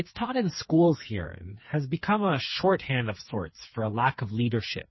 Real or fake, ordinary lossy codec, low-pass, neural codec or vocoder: fake; MP3, 24 kbps; 7.2 kHz; codec, 16 kHz, 4 kbps, FreqCodec, smaller model